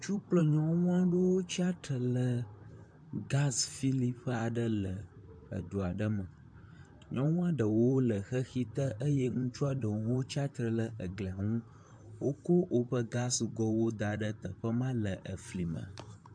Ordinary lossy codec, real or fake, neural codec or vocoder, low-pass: MP3, 64 kbps; fake; vocoder, 44.1 kHz, 128 mel bands, Pupu-Vocoder; 9.9 kHz